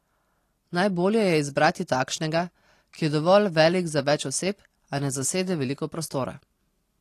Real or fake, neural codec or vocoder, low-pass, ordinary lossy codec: real; none; 14.4 kHz; AAC, 48 kbps